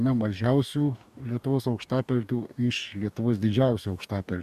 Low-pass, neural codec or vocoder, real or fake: 14.4 kHz; codec, 44.1 kHz, 2.6 kbps, DAC; fake